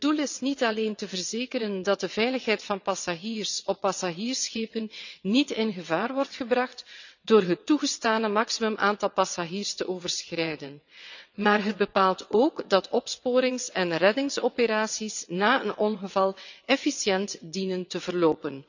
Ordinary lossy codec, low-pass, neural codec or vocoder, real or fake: none; 7.2 kHz; vocoder, 22.05 kHz, 80 mel bands, WaveNeXt; fake